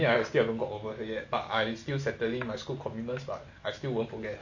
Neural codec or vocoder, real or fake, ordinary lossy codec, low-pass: none; real; none; 7.2 kHz